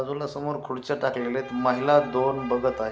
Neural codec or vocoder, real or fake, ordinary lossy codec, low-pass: none; real; none; none